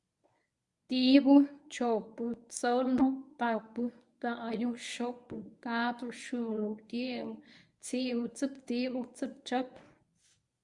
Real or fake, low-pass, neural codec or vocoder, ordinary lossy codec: fake; 10.8 kHz; codec, 24 kHz, 0.9 kbps, WavTokenizer, medium speech release version 1; Opus, 64 kbps